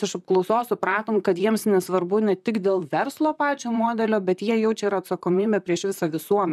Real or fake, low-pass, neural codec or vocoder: fake; 14.4 kHz; vocoder, 44.1 kHz, 128 mel bands, Pupu-Vocoder